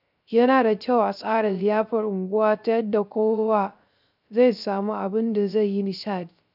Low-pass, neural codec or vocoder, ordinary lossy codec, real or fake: 5.4 kHz; codec, 16 kHz, 0.3 kbps, FocalCodec; none; fake